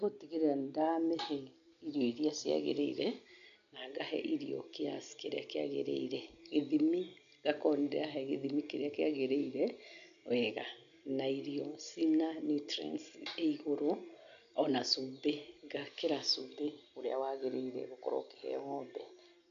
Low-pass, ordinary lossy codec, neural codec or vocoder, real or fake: 7.2 kHz; none; none; real